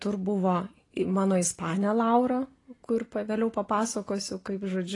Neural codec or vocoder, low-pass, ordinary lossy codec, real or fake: none; 10.8 kHz; AAC, 32 kbps; real